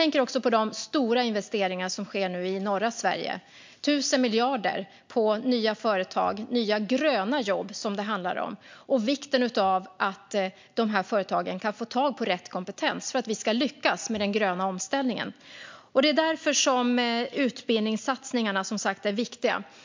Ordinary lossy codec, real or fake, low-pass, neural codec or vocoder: MP3, 64 kbps; real; 7.2 kHz; none